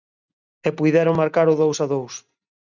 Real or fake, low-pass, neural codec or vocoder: real; 7.2 kHz; none